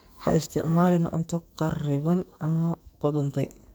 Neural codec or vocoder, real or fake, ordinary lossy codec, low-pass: codec, 44.1 kHz, 2.6 kbps, SNAC; fake; none; none